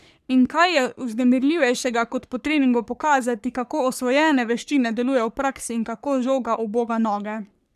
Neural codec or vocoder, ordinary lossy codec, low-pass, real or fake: codec, 44.1 kHz, 3.4 kbps, Pupu-Codec; none; 14.4 kHz; fake